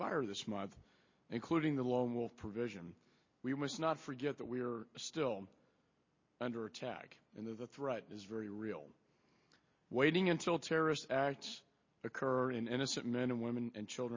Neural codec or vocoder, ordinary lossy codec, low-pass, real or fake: none; MP3, 32 kbps; 7.2 kHz; real